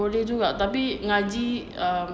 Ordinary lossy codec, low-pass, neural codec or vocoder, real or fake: none; none; none; real